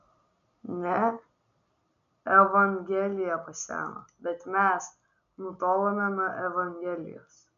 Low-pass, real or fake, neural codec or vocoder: 7.2 kHz; real; none